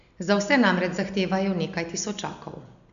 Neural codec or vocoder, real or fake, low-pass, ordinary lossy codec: none; real; 7.2 kHz; none